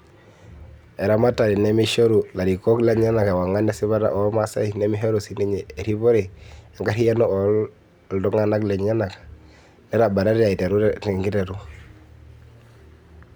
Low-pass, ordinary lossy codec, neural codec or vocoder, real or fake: none; none; none; real